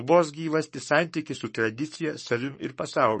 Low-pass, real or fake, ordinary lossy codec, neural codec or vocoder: 10.8 kHz; fake; MP3, 32 kbps; codec, 44.1 kHz, 7.8 kbps, Pupu-Codec